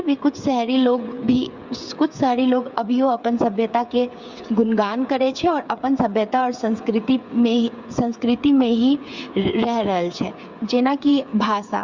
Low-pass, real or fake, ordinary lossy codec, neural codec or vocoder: 7.2 kHz; fake; Opus, 64 kbps; codec, 16 kHz, 8 kbps, FreqCodec, smaller model